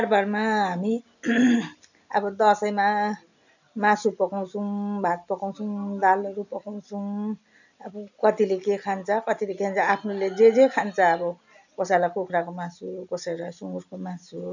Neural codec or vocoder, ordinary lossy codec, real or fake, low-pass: none; none; real; 7.2 kHz